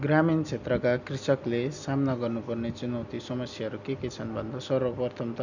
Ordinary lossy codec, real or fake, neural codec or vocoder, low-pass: none; real; none; 7.2 kHz